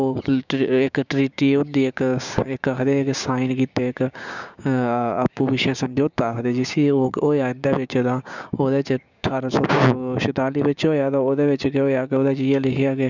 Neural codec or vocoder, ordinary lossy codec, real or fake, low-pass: codec, 16 kHz, 6 kbps, DAC; none; fake; 7.2 kHz